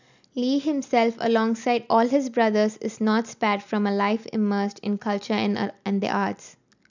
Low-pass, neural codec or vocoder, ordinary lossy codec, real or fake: 7.2 kHz; none; none; real